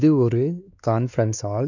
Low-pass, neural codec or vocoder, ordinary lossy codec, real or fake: 7.2 kHz; codec, 16 kHz, 2 kbps, X-Codec, WavLM features, trained on Multilingual LibriSpeech; none; fake